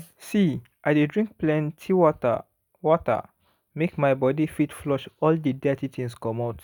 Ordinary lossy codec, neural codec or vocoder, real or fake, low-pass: none; none; real; none